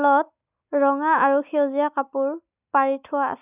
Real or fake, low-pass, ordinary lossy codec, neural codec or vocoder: real; 3.6 kHz; none; none